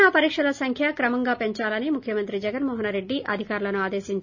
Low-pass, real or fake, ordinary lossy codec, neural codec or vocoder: 7.2 kHz; real; none; none